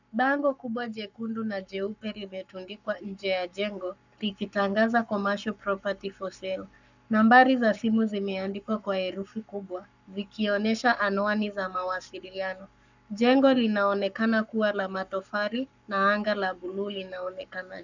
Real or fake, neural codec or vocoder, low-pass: fake; codec, 44.1 kHz, 7.8 kbps, Pupu-Codec; 7.2 kHz